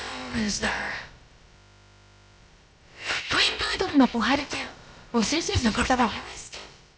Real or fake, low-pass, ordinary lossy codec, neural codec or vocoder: fake; none; none; codec, 16 kHz, about 1 kbps, DyCAST, with the encoder's durations